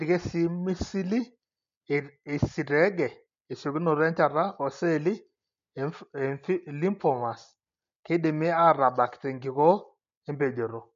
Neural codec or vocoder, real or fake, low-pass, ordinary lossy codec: none; real; 7.2 kHz; MP3, 48 kbps